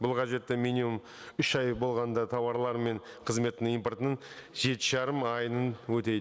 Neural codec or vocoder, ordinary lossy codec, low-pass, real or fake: none; none; none; real